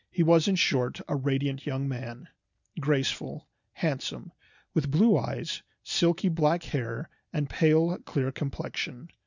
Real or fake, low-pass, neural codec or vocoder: real; 7.2 kHz; none